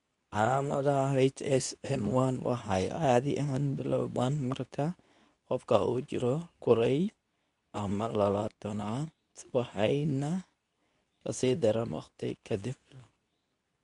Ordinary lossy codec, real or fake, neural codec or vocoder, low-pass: none; fake; codec, 24 kHz, 0.9 kbps, WavTokenizer, medium speech release version 2; 10.8 kHz